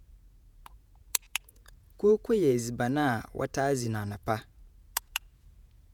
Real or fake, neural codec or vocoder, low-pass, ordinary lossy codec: fake; vocoder, 48 kHz, 128 mel bands, Vocos; none; none